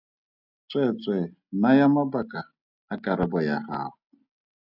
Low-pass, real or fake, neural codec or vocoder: 5.4 kHz; real; none